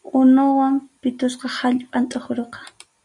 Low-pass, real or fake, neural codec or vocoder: 10.8 kHz; real; none